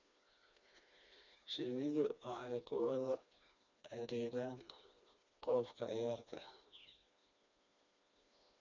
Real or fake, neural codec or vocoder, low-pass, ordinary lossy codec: fake; codec, 16 kHz, 2 kbps, FreqCodec, smaller model; 7.2 kHz; MP3, 64 kbps